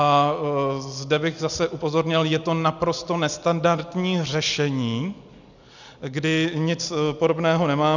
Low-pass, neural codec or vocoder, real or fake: 7.2 kHz; none; real